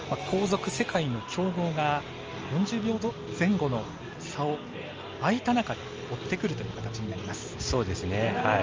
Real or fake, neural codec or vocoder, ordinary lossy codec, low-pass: real; none; Opus, 24 kbps; 7.2 kHz